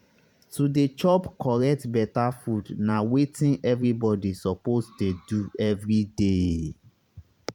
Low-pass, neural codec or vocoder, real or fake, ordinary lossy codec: 19.8 kHz; vocoder, 44.1 kHz, 128 mel bands every 512 samples, BigVGAN v2; fake; none